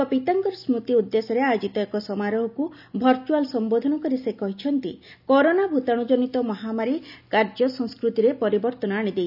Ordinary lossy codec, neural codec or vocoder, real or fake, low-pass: none; none; real; 5.4 kHz